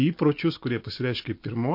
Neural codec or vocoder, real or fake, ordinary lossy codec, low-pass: none; real; MP3, 32 kbps; 5.4 kHz